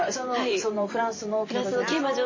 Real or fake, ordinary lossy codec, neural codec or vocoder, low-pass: fake; MP3, 32 kbps; vocoder, 44.1 kHz, 128 mel bands every 512 samples, BigVGAN v2; 7.2 kHz